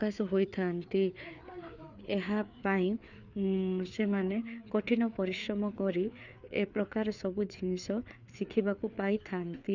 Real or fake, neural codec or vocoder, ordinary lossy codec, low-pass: fake; codec, 16 kHz, 4 kbps, FreqCodec, larger model; none; 7.2 kHz